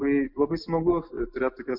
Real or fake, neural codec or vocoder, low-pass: real; none; 5.4 kHz